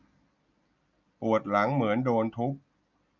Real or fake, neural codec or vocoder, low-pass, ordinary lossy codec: real; none; 7.2 kHz; none